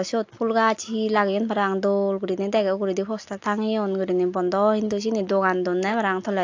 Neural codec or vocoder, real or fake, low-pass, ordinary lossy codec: none; real; 7.2 kHz; AAC, 48 kbps